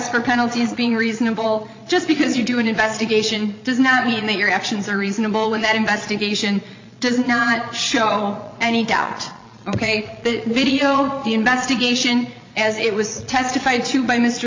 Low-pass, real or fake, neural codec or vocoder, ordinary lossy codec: 7.2 kHz; fake; vocoder, 22.05 kHz, 80 mel bands, Vocos; MP3, 48 kbps